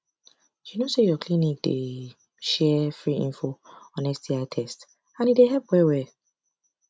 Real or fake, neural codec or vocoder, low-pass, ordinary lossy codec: real; none; none; none